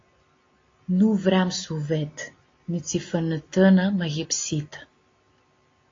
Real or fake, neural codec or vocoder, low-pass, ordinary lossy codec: real; none; 7.2 kHz; AAC, 32 kbps